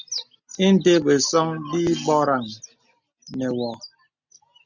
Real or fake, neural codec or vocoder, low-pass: real; none; 7.2 kHz